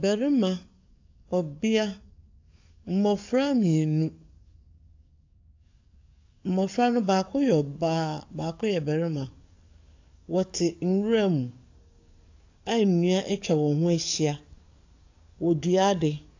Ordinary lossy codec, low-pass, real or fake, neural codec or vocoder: AAC, 48 kbps; 7.2 kHz; fake; codec, 44.1 kHz, 7.8 kbps, DAC